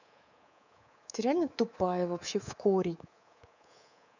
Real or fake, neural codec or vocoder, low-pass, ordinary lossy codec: fake; codec, 24 kHz, 3.1 kbps, DualCodec; 7.2 kHz; none